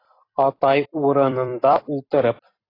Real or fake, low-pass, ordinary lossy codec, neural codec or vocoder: fake; 5.4 kHz; AAC, 24 kbps; vocoder, 44.1 kHz, 128 mel bands every 256 samples, BigVGAN v2